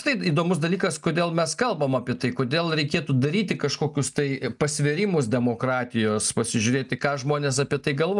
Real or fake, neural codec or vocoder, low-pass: real; none; 10.8 kHz